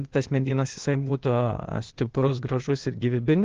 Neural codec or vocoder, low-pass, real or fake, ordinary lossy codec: codec, 16 kHz, 0.8 kbps, ZipCodec; 7.2 kHz; fake; Opus, 16 kbps